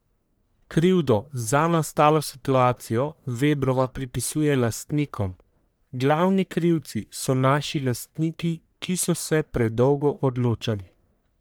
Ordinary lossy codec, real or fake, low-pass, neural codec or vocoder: none; fake; none; codec, 44.1 kHz, 1.7 kbps, Pupu-Codec